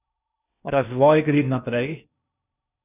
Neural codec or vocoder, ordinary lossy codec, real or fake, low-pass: codec, 16 kHz in and 24 kHz out, 0.8 kbps, FocalCodec, streaming, 65536 codes; none; fake; 3.6 kHz